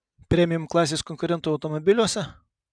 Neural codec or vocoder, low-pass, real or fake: none; 9.9 kHz; real